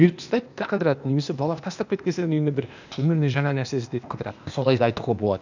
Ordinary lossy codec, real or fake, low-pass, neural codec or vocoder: none; fake; 7.2 kHz; codec, 16 kHz, 0.8 kbps, ZipCodec